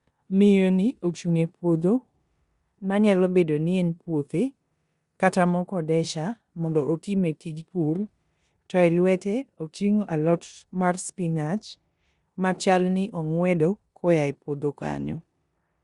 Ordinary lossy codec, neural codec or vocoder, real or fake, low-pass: Opus, 64 kbps; codec, 16 kHz in and 24 kHz out, 0.9 kbps, LongCat-Audio-Codec, four codebook decoder; fake; 10.8 kHz